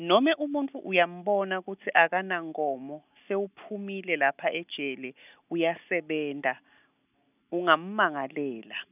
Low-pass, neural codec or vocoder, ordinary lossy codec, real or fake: 3.6 kHz; none; none; real